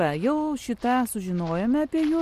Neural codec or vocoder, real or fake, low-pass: none; real; 14.4 kHz